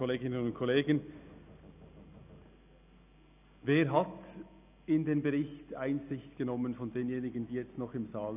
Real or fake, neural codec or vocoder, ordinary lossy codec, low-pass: real; none; none; 3.6 kHz